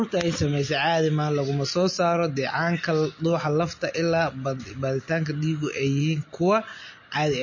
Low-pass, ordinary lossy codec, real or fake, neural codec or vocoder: 7.2 kHz; MP3, 32 kbps; real; none